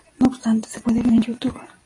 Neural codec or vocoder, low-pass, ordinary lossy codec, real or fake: vocoder, 44.1 kHz, 128 mel bands every 256 samples, BigVGAN v2; 10.8 kHz; AAC, 32 kbps; fake